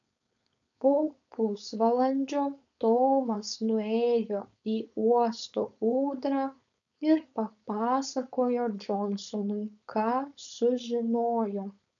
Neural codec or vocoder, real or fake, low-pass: codec, 16 kHz, 4.8 kbps, FACodec; fake; 7.2 kHz